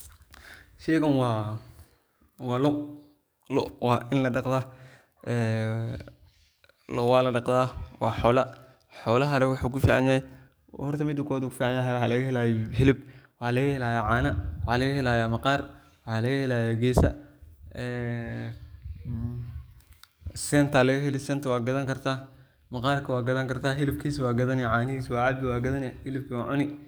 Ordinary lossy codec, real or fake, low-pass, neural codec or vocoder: none; fake; none; codec, 44.1 kHz, 7.8 kbps, DAC